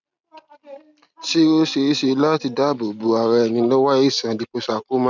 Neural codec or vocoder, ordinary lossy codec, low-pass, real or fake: none; none; 7.2 kHz; real